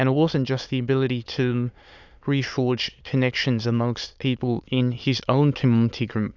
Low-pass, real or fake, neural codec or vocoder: 7.2 kHz; fake; autoencoder, 22.05 kHz, a latent of 192 numbers a frame, VITS, trained on many speakers